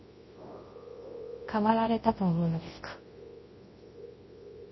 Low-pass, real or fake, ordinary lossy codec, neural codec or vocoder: 7.2 kHz; fake; MP3, 24 kbps; codec, 24 kHz, 0.9 kbps, WavTokenizer, large speech release